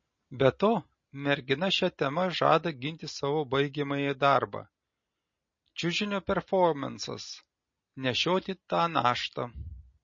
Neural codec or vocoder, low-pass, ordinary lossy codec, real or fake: none; 7.2 kHz; MP3, 32 kbps; real